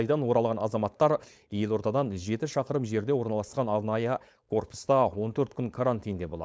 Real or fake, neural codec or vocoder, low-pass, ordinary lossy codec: fake; codec, 16 kHz, 4.8 kbps, FACodec; none; none